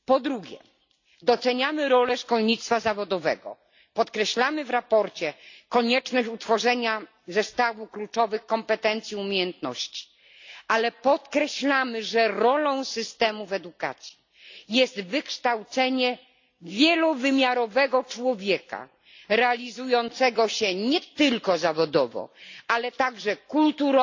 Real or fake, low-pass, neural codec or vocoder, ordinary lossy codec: real; 7.2 kHz; none; AAC, 48 kbps